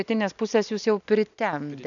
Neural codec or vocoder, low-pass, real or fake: none; 7.2 kHz; real